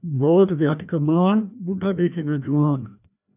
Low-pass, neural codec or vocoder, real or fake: 3.6 kHz; codec, 16 kHz, 1 kbps, FreqCodec, larger model; fake